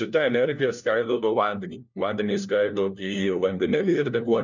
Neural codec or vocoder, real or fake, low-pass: codec, 16 kHz, 1 kbps, FunCodec, trained on LibriTTS, 50 frames a second; fake; 7.2 kHz